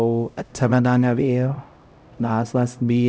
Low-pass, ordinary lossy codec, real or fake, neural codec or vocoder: none; none; fake; codec, 16 kHz, 0.5 kbps, X-Codec, HuBERT features, trained on LibriSpeech